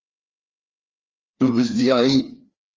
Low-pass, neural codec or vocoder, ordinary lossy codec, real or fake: 7.2 kHz; codec, 16 kHz, 2 kbps, FreqCodec, larger model; Opus, 24 kbps; fake